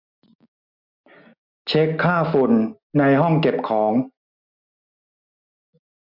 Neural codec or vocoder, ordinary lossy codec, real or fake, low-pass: none; MP3, 48 kbps; real; 5.4 kHz